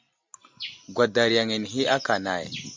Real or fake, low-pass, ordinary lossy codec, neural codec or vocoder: real; 7.2 kHz; MP3, 64 kbps; none